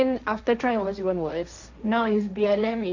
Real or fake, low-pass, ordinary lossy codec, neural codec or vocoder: fake; 7.2 kHz; none; codec, 16 kHz, 1.1 kbps, Voila-Tokenizer